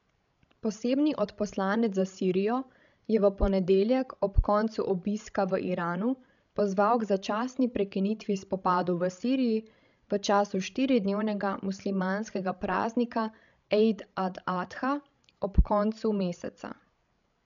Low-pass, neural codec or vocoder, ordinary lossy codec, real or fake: 7.2 kHz; codec, 16 kHz, 16 kbps, FreqCodec, larger model; none; fake